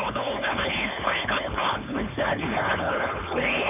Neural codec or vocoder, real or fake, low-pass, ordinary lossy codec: codec, 16 kHz, 4.8 kbps, FACodec; fake; 3.6 kHz; none